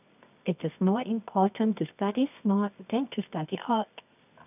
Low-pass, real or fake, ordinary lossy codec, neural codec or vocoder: 3.6 kHz; fake; none; codec, 24 kHz, 0.9 kbps, WavTokenizer, medium music audio release